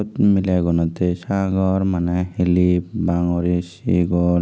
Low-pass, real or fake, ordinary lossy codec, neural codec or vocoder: none; real; none; none